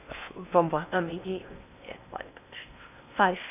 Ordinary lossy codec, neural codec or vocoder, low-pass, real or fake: none; codec, 16 kHz in and 24 kHz out, 0.8 kbps, FocalCodec, streaming, 65536 codes; 3.6 kHz; fake